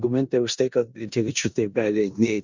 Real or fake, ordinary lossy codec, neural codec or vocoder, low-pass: fake; Opus, 64 kbps; codec, 16 kHz in and 24 kHz out, 0.9 kbps, LongCat-Audio-Codec, four codebook decoder; 7.2 kHz